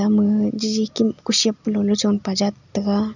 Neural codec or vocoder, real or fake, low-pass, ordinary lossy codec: none; real; 7.2 kHz; none